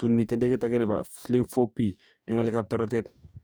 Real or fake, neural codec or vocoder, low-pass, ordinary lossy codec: fake; codec, 44.1 kHz, 2.6 kbps, DAC; none; none